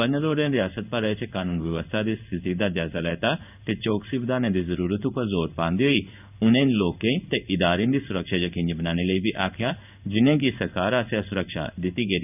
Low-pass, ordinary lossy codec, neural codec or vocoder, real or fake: 3.6 kHz; none; codec, 16 kHz in and 24 kHz out, 1 kbps, XY-Tokenizer; fake